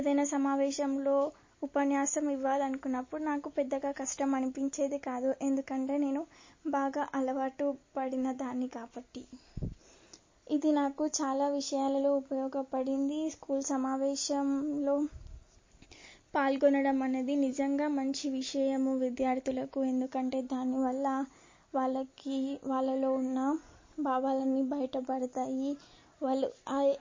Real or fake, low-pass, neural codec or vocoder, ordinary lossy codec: real; 7.2 kHz; none; MP3, 32 kbps